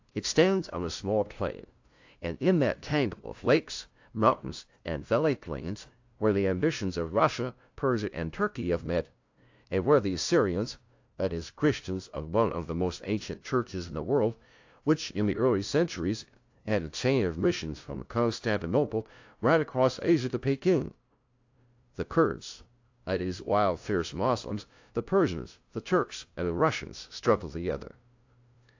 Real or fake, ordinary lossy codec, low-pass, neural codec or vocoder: fake; AAC, 48 kbps; 7.2 kHz; codec, 16 kHz, 0.5 kbps, FunCodec, trained on LibriTTS, 25 frames a second